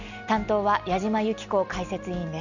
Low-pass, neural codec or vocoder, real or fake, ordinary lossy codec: 7.2 kHz; none; real; none